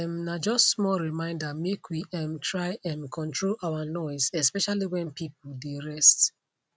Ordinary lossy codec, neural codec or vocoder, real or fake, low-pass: none; none; real; none